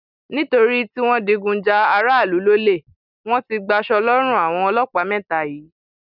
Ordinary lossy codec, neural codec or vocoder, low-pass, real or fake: none; none; 5.4 kHz; real